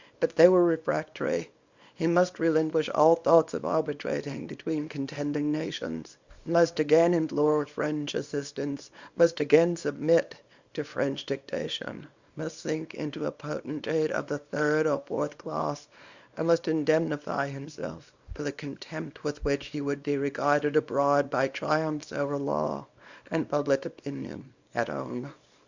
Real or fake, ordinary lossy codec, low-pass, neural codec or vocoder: fake; Opus, 64 kbps; 7.2 kHz; codec, 24 kHz, 0.9 kbps, WavTokenizer, small release